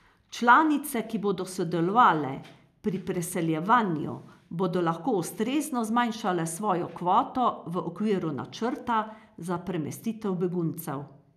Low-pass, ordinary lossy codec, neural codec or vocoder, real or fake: 14.4 kHz; none; none; real